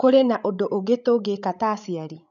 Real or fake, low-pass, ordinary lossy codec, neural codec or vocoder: fake; 7.2 kHz; none; codec, 16 kHz, 16 kbps, FreqCodec, larger model